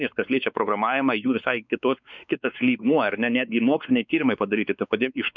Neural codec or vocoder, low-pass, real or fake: codec, 16 kHz, 4 kbps, X-Codec, WavLM features, trained on Multilingual LibriSpeech; 7.2 kHz; fake